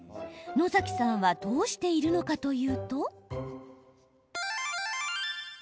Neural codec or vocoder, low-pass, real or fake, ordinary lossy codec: none; none; real; none